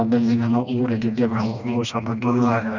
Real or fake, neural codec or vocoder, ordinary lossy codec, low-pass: fake; codec, 16 kHz, 1 kbps, FreqCodec, smaller model; none; 7.2 kHz